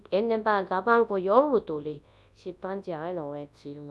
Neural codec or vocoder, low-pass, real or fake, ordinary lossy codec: codec, 24 kHz, 0.9 kbps, WavTokenizer, large speech release; none; fake; none